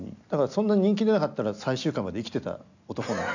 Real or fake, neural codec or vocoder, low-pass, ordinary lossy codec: real; none; 7.2 kHz; none